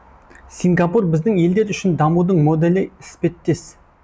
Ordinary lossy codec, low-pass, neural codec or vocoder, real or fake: none; none; none; real